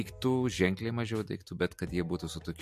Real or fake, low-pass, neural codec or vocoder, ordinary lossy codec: real; 14.4 kHz; none; MP3, 64 kbps